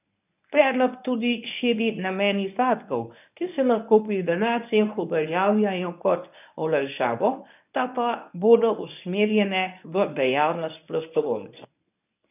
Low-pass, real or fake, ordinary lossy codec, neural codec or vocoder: 3.6 kHz; fake; none; codec, 24 kHz, 0.9 kbps, WavTokenizer, medium speech release version 1